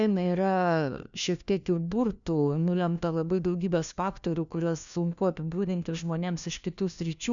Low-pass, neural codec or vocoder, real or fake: 7.2 kHz; codec, 16 kHz, 1 kbps, FunCodec, trained on LibriTTS, 50 frames a second; fake